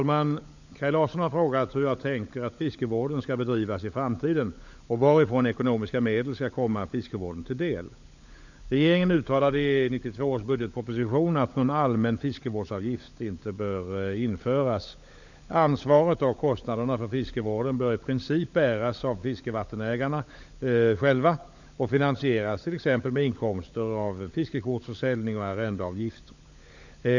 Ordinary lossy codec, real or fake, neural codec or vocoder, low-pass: none; fake; codec, 16 kHz, 16 kbps, FunCodec, trained on LibriTTS, 50 frames a second; 7.2 kHz